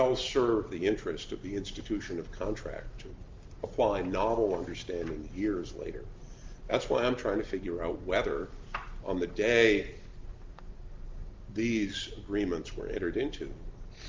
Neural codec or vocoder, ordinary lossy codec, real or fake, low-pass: none; Opus, 32 kbps; real; 7.2 kHz